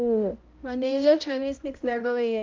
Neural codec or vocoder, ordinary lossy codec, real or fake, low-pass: codec, 16 kHz, 0.5 kbps, X-Codec, HuBERT features, trained on balanced general audio; Opus, 24 kbps; fake; 7.2 kHz